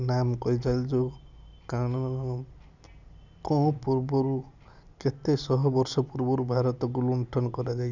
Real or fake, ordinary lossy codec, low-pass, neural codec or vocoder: real; none; 7.2 kHz; none